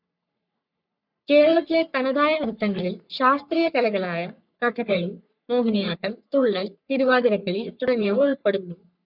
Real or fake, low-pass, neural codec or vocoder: fake; 5.4 kHz; codec, 44.1 kHz, 3.4 kbps, Pupu-Codec